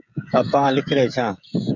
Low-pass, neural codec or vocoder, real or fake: 7.2 kHz; codec, 16 kHz, 16 kbps, FreqCodec, smaller model; fake